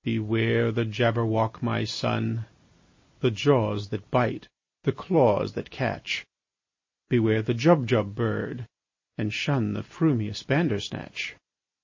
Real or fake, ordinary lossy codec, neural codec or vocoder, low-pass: real; MP3, 32 kbps; none; 7.2 kHz